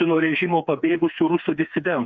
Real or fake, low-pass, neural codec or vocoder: fake; 7.2 kHz; codec, 16 kHz, 4 kbps, FreqCodec, smaller model